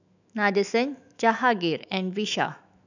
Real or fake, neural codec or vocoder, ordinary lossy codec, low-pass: fake; autoencoder, 48 kHz, 128 numbers a frame, DAC-VAE, trained on Japanese speech; none; 7.2 kHz